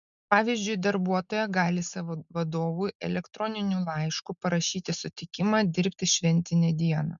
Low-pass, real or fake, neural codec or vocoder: 7.2 kHz; real; none